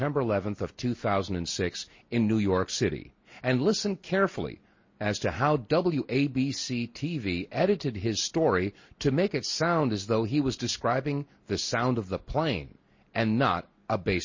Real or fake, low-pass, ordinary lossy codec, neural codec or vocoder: real; 7.2 kHz; MP3, 32 kbps; none